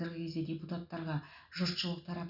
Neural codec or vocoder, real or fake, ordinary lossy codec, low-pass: none; real; none; 5.4 kHz